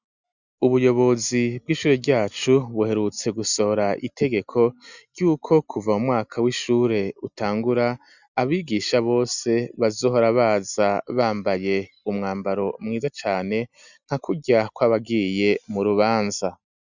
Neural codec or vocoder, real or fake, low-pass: none; real; 7.2 kHz